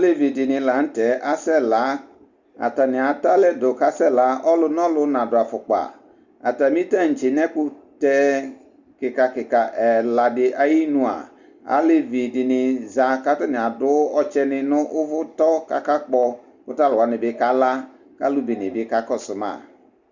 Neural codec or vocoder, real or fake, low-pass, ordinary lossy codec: none; real; 7.2 kHz; Opus, 64 kbps